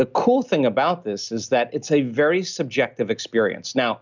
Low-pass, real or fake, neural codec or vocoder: 7.2 kHz; real; none